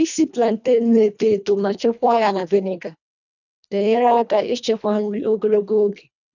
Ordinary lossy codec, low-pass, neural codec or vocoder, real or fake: none; 7.2 kHz; codec, 24 kHz, 1.5 kbps, HILCodec; fake